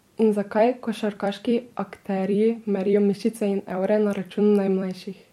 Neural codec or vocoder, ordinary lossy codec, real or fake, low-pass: vocoder, 44.1 kHz, 128 mel bands every 256 samples, BigVGAN v2; MP3, 64 kbps; fake; 19.8 kHz